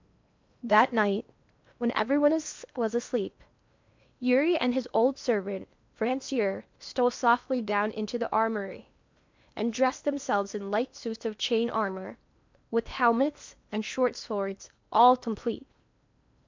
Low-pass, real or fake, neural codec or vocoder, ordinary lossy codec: 7.2 kHz; fake; codec, 16 kHz in and 24 kHz out, 0.8 kbps, FocalCodec, streaming, 65536 codes; MP3, 64 kbps